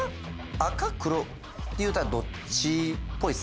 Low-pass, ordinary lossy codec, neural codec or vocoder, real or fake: none; none; none; real